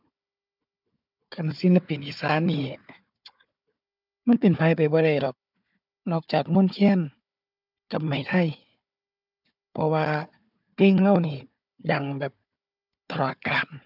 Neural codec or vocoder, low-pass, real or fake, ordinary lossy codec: codec, 16 kHz, 4 kbps, FunCodec, trained on Chinese and English, 50 frames a second; 5.4 kHz; fake; none